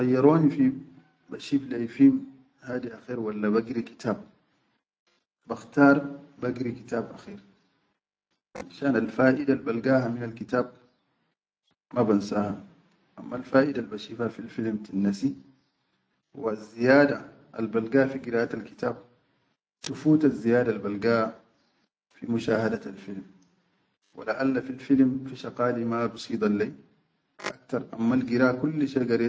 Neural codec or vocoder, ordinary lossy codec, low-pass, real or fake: none; none; none; real